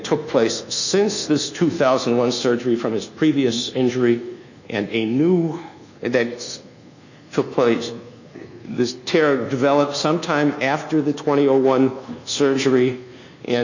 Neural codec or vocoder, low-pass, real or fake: codec, 24 kHz, 1.2 kbps, DualCodec; 7.2 kHz; fake